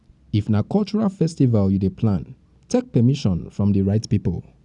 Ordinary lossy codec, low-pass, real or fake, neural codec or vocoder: none; 10.8 kHz; real; none